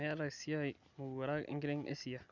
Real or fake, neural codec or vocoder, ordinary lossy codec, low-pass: real; none; none; 7.2 kHz